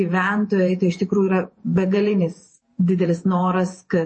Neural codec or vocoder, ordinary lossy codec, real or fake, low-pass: vocoder, 48 kHz, 128 mel bands, Vocos; MP3, 32 kbps; fake; 9.9 kHz